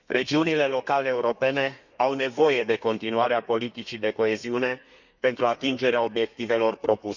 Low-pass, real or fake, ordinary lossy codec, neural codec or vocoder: 7.2 kHz; fake; none; codec, 32 kHz, 1.9 kbps, SNAC